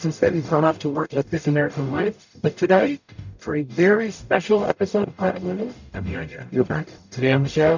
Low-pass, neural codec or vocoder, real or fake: 7.2 kHz; codec, 44.1 kHz, 0.9 kbps, DAC; fake